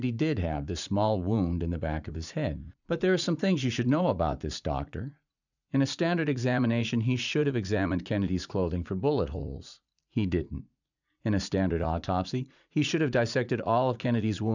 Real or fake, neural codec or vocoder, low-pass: fake; vocoder, 44.1 kHz, 80 mel bands, Vocos; 7.2 kHz